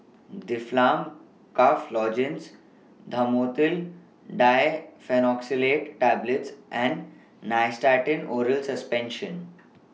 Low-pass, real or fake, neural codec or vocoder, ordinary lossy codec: none; real; none; none